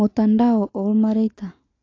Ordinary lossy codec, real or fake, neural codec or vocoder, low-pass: AAC, 32 kbps; real; none; 7.2 kHz